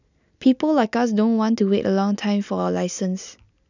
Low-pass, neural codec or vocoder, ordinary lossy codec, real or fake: 7.2 kHz; none; none; real